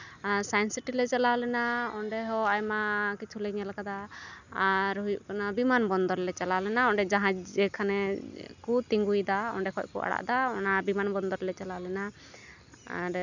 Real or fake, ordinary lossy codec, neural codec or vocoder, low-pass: real; none; none; 7.2 kHz